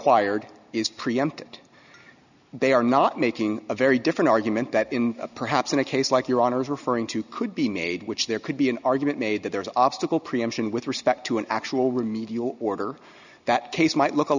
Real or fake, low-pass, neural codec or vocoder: real; 7.2 kHz; none